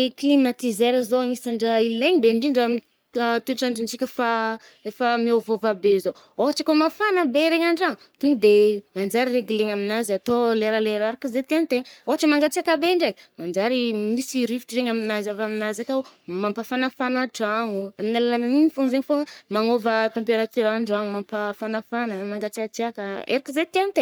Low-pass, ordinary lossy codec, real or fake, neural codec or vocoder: none; none; fake; codec, 44.1 kHz, 3.4 kbps, Pupu-Codec